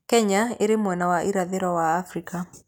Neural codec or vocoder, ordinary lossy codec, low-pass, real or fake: none; none; none; real